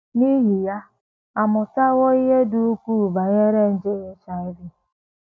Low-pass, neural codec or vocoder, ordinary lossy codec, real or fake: none; none; none; real